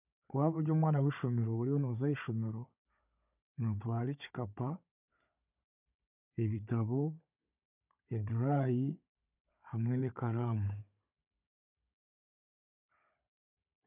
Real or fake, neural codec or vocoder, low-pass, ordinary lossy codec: fake; codec, 16 kHz, 16 kbps, FunCodec, trained on Chinese and English, 50 frames a second; 3.6 kHz; none